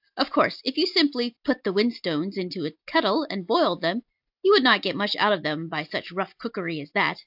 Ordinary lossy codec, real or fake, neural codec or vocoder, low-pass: AAC, 48 kbps; real; none; 5.4 kHz